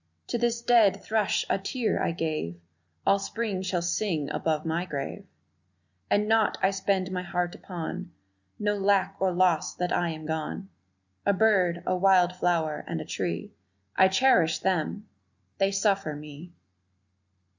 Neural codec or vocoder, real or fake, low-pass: none; real; 7.2 kHz